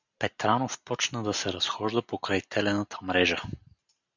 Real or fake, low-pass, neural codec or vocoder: real; 7.2 kHz; none